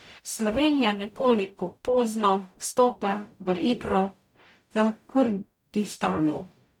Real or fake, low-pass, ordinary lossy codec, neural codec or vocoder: fake; 19.8 kHz; none; codec, 44.1 kHz, 0.9 kbps, DAC